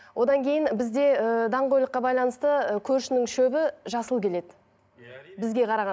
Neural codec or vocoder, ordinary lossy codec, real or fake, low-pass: none; none; real; none